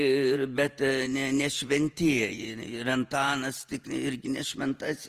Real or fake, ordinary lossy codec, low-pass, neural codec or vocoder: fake; Opus, 24 kbps; 14.4 kHz; vocoder, 48 kHz, 128 mel bands, Vocos